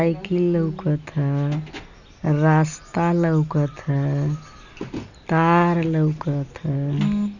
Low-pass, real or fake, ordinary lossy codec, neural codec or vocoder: 7.2 kHz; real; none; none